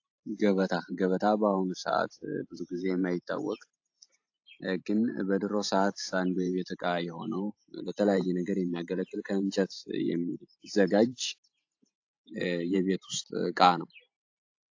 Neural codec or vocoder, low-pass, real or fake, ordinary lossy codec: vocoder, 44.1 kHz, 128 mel bands every 512 samples, BigVGAN v2; 7.2 kHz; fake; AAC, 48 kbps